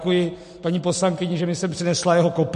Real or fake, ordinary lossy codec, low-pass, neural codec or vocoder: real; MP3, 48 kbps; 10.8 kHz; none